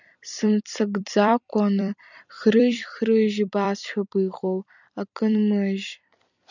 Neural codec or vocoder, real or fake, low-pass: vocoder, 44.1 kHz, 128 mel bands every 256 samples, BigVGAN v2; fake; 7.2 kHz